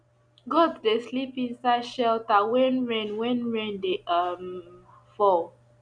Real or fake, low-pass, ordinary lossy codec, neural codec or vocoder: real; 9.9 kHz; none; none